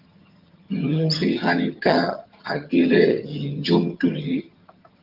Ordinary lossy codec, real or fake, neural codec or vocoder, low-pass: Opus, 32 kbps; fake; vocoder, 22.05 kHz, 80 mel bands, HiFi-GAN; 5.4 kHz